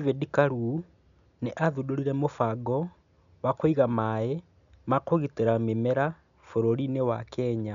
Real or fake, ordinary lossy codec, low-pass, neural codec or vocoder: real; MP3, 96 kbps; 7.2 kHz; none